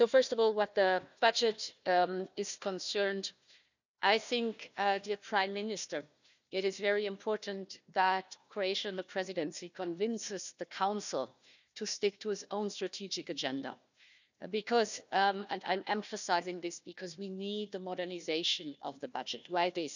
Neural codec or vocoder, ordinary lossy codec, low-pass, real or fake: codec, 16 kHz, 1 kbps, FunCodec, trained on Chinese and English, 50 frames a second; none; 7.2 kHz; fake